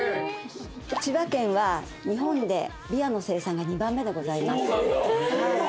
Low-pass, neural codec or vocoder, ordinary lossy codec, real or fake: none; none; none; real